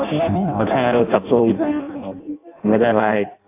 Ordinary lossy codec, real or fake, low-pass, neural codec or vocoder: AAC, 24 kbps; fake; 3.6 kHz; codec, 16 kHz in and 24 kHz out, 0.6 kbps, FireRedTTS-2 codec